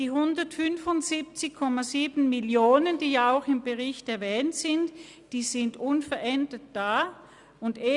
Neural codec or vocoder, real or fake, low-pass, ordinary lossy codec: none; real; 10.8 kHz; Opus, 64 kbps